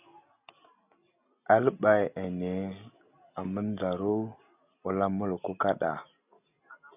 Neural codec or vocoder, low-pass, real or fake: none; 3.6 kHz; real